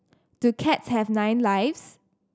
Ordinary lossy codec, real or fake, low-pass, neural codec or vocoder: none; real; none; none